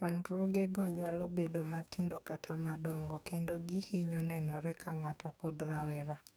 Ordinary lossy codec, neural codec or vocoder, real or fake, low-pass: none; codec, 44.1 kHz, 2.6 kbps, DAC; fake; none